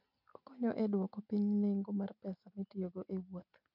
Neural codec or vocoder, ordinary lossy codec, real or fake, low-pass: none; none; real; 5.4 kHz